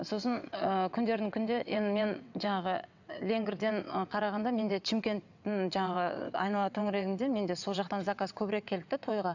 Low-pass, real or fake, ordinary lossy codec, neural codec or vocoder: 7.2 kHz; fake; none; vocoder, 22.05 kHz, 80 mel bands, WaveNeXt